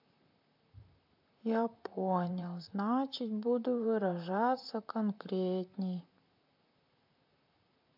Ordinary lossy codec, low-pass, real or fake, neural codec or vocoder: MP3, 48 kbps; 5.4 kHz; fake; vocoder, 44.1 kHz, 128 mel bands, Pupu-Vocoder